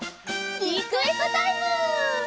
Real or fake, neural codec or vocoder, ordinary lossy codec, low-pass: real; none; none; none